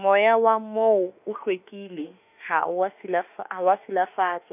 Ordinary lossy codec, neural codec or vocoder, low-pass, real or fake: none; autoencoder, 48 kHz, 32 numbers a frame, DAC-VAE, trained on Japanese speech; 3.6 kHz; fake